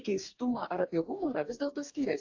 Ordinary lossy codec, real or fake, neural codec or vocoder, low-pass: AAC, 48 kbps; fake; codec, 44.1 kHz, 2.6 kbps, DAC; 7.2 kHz